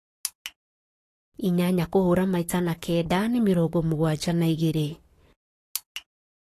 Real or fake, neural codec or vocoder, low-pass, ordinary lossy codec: fake; codec, 44.1 kHz, 7.8 kbps, Pupu-Codec; 14.4 kHz; AAC, 48 kbps